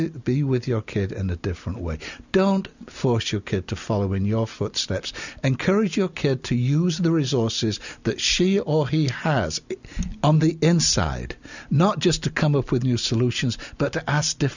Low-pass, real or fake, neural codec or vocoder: 7.2 kHz; real; none